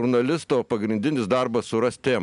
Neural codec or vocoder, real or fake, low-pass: none; real; 10.8 kHz